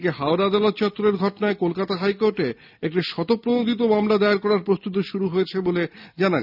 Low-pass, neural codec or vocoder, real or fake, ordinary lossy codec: 5.4 kHz; none; real; none